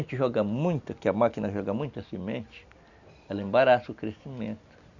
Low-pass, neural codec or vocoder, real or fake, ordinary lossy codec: 7.2 kHz; none; real; none